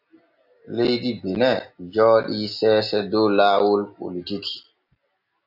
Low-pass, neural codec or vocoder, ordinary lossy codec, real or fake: 5.4 kHz; none; AAC, 48 kbps; real